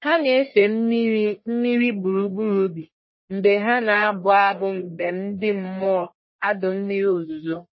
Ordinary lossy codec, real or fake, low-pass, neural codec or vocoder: MP3, 24 kbps; fake; 7.2 kHz; codec, 44.1 kHz, 1.7 kbps, Pupu-Codec